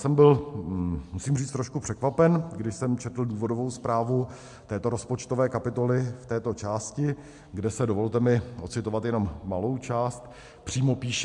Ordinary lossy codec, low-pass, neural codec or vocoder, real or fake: MP3, 64 kbps; 10.8 kHz; none; real